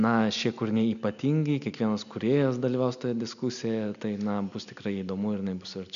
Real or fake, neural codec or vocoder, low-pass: real; none; 7.2 kHz